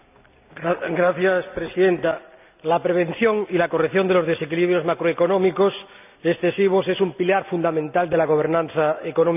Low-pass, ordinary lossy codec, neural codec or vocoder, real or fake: 3.6 kHz; none; none; real